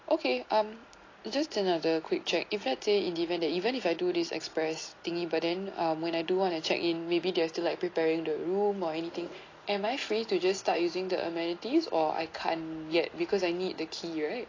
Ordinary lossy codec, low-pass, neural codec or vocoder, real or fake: AAC, 32 kbps; 7.2 kHz; none; real